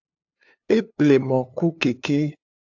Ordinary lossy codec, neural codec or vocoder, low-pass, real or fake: AAC, 48 kbps; codec, 16 kHz, 2 kbps, FunCodec, trained on LibriTTS, 25 frames a second; 7.2 kHz; fake